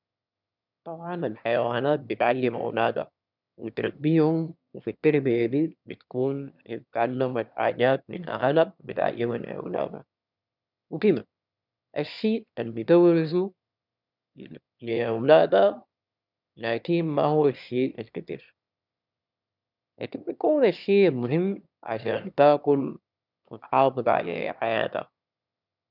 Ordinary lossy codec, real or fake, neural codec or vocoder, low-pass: none; fake; autoencoder, 22.05 kHz, a latent of 192 numbers a frame, VITS, trained on one speaker; 5.4 kHz